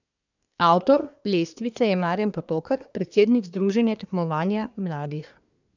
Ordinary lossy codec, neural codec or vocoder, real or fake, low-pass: none; codec, 24 kHz, 1 kbps, SNAC; fake; 7.2 kHz